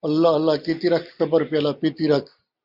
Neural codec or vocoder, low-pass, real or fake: none; 5.4 kHz; real